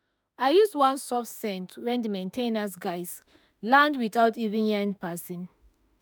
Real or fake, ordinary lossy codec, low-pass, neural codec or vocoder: fake; none; none; autoencoder, 48 kHz, 32 numbers a frame, DAC-VAE, trained on Japanese speech